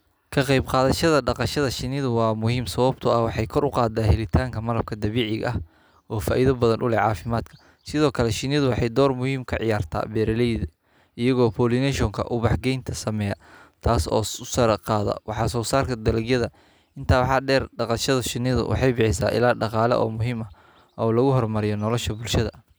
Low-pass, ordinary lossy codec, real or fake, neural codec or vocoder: none; none; real; none